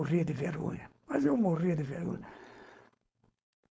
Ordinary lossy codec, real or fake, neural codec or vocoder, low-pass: none; fake; codec, 16 kHz, 4.8 kbps, FACodec; none